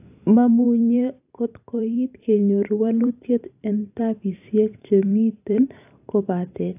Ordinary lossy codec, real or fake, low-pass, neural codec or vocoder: AAC, 24 kbps; fake; 3.6 kHz; vocoder, 44.1 kHz, 128 mel bands every 512 samples, BigVGAN v2